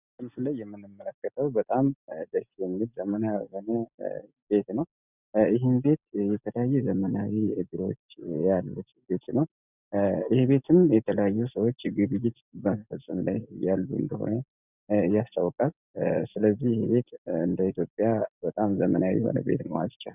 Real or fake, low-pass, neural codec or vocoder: real; 3.6 kHz; none